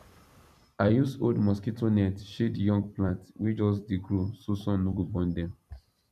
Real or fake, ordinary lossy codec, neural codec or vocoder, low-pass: fake; none; vocoder, 44.1 kHz, 128 mel bands every 256 samples, BigVGAN v2; 14.4 kHz